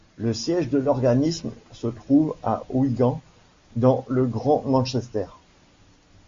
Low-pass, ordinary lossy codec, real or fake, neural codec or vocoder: 7.2 kHz; MP3, 48 kbps; real; none